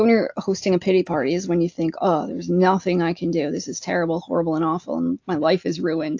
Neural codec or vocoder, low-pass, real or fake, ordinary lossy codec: none; 7.2 kHz; real; AAC, 48 kbps